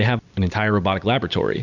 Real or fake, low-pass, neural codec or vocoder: real; 7.2 kHz; none